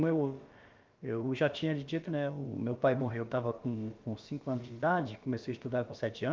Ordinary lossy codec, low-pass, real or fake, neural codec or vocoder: Opus, 32 kbps; 7.2 kHz; fake; codec, 16 kHz, about 1 kbps, DyCAST, with the encoder's durations